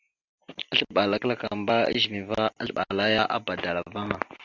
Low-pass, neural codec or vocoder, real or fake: 7.2 kHz; none; real